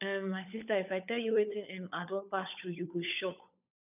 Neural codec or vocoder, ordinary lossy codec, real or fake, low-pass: codec, 16 kHz, 16 kbps, FunCodec, trained on LibriTTS, 50 frames a second; none; fake; 3.6 kHz